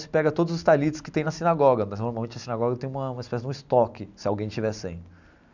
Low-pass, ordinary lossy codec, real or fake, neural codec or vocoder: 7.2 kHz; none; real; none